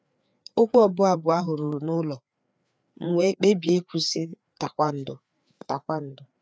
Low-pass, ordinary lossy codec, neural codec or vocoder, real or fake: none; none; codec, 16 kHz, 4 kbps, FreqCodec, larger model; fake